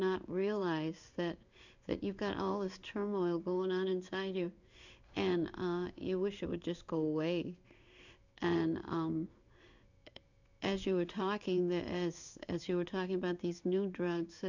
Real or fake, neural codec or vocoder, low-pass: fake; codec, 16 kHz in and 24 kHz out, 1 kbps, XY-Tokenizer; 7.2 kHz